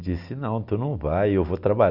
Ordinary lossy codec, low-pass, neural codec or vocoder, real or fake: none; 5.4 kHz; none; real